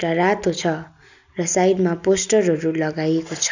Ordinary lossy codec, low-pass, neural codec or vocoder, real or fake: none; 7.2 kHz; none; real